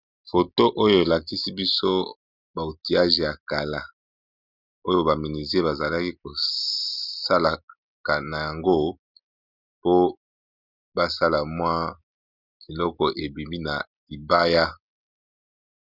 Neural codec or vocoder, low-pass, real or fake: none; 5.4 kHz; real